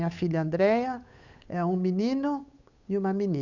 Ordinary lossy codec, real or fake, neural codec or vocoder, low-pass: none; fake; codec, 16 kHz, 8 kbps, FunCodec, trained on Chinese and English, 25 frames a second; 7.2 kHz